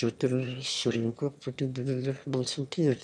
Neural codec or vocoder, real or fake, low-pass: autoencoder, 22.05 kHz, a latent of 192 numbers a frame, VITS, trained on one speaker; fake; 9.9 kHz